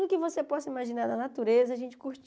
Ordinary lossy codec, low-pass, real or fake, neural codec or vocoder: none; none; real; none